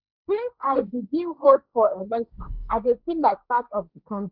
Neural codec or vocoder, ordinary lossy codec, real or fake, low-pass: codec, 16 kHz, 1.1 kbps, Voila-Tokenizer; none; fake; 5.4 kHz